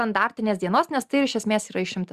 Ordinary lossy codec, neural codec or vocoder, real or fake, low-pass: Opus, 64 kbps; none; real; 14.4 kHz